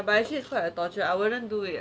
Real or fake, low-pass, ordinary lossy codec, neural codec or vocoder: real; none; none; none